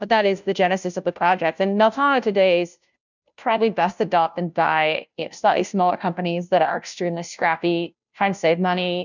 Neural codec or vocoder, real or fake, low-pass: codec, 16 kHz, 0.5 kbps, FunCodec, trained on Chinese and English, 25 frames a second; fake; 7.2 kHz